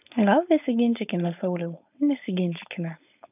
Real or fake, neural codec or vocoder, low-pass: fake; codec, 16 kHz, 4.8 kbps, FACodec; 3.6 kHz